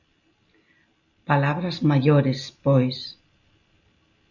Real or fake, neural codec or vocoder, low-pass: real; none; 7.2 kHz